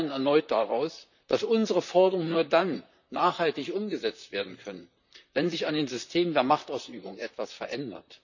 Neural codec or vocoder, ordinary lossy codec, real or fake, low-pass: vocoder, 44.1 kHz, 128 mel bands, Pupu-Vocoder; none; fake; 7.2 kHz